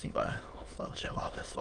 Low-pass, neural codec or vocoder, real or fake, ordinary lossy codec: 9.9 kHz; autoencoder, 22.05 kHz, a latent of 192 numbers a frame, VITS, trained on many speakers; fake; Opus, 24 kbps